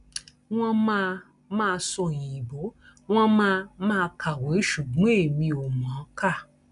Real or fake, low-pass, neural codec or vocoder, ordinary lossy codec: real; 10.8 kHz; none; none